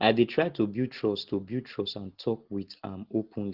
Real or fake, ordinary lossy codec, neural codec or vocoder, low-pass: real; Opus, 16 kbps; none; 5.4 kHz